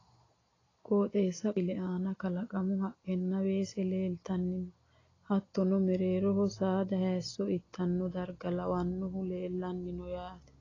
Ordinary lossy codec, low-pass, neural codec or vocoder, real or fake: AAC, 32 kbps; 7.2 kHz; none; real